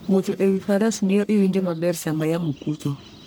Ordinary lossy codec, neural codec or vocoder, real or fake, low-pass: none; codec, 44.1 kHz, 1.7 kbps, Pupu-Codec; fake; none